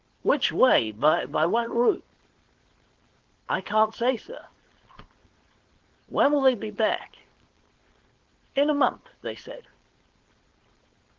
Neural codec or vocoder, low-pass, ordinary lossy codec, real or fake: codec, 16 kHz, 4.8 kbps, FACodec; 7.2 kHz; Opus, 16 kbps; fake